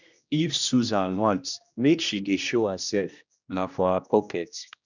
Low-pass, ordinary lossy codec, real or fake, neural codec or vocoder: 7.2 kHz; none; fake; codec, 16 kHz, 1 kbps, X-Codec, HuBERT features, trained on general audio